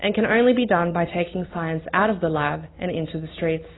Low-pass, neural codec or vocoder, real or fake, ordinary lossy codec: 7.2 kHz; none; real; AAC, 16 kbps